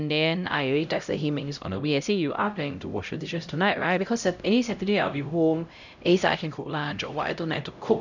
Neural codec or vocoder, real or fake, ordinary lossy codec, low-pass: codec, 16 kHz, 0.5 kbps, X-Codec, HuBERT features, trained on LibriSpeech; fake; none; 7.2 kHz